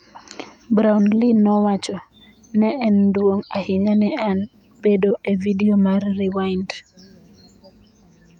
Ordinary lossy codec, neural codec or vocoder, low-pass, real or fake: none; autoencoder, 48 kHz, 128 numbers a frame, DAC-VAE, trained on Japanese speech; 19.8 kHz; fake